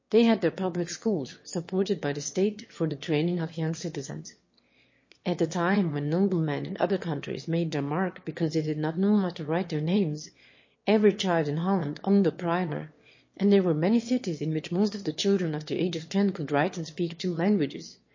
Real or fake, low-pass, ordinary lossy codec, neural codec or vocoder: fake; 7.2 kHz; MP3, 32 kbps; autoencoder, 22.05 kHz, a latent of 192 numbers a frame, VITS, trained on one speaker